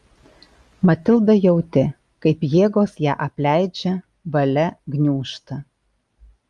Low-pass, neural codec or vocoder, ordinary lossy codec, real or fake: 10.8 kHz; none; Opus, 32 kbps; real